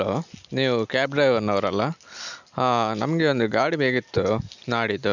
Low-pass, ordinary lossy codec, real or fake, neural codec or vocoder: 7.2 kHz; none; real; none